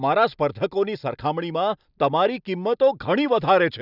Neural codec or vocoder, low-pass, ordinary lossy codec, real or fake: none; 5.4 kHz; none; real